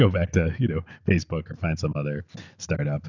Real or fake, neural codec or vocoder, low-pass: real; none; 7.2 kHz